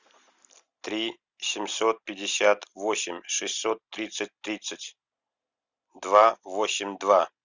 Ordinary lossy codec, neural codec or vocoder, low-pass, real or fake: Opus, 64 kbps; none; 7.2 kHz; real